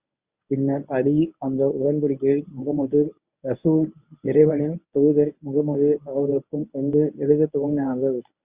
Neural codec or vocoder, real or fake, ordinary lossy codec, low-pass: codec, 24 kHz, 0.9 kbps, WavTokenizer, medium speech release version 1; fake; Opus, 64 kbps; 3.6 kHz